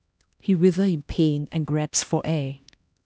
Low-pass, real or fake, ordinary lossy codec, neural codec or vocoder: none; fake; none; codec, 16 kHz, 1 kbps, X-Codec, HuBERT features, trained on LibriSpeech